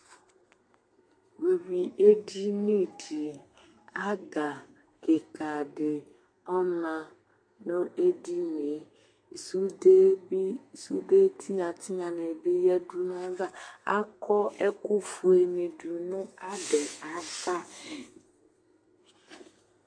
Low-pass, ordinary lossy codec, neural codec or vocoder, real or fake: 9.9 kHz; MP3, 48 kbps; codec, 32 kHz, 1.9 kbps, SNAC; fake